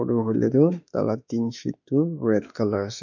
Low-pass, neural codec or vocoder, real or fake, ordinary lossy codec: 7.2 kHz; autoencoder, 48 kHz, 32 numbers a frame, DAC-VAE, trained on Japanese speech; fake; none